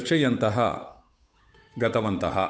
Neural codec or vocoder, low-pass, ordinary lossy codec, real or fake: codec, 16 kHz, 8 kbps, FunCodec, trained on Chinese and English, 25 frames a second; none; none; fake